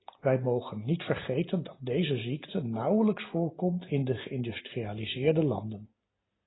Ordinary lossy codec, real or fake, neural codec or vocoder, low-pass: AAC, 16 kbps; real; none; 7.2 kHz